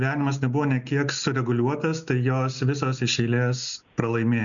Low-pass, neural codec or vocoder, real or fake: 7.2 kHz; none; real